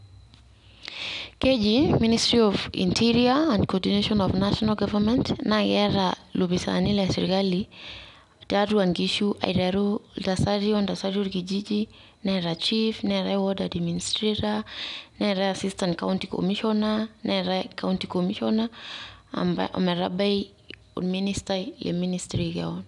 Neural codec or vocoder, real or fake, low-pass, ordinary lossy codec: none; real; 10.8 kHz; none